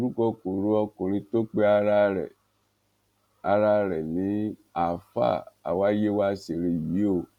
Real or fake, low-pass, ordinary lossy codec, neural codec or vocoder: real; 19.8 kHz; none; none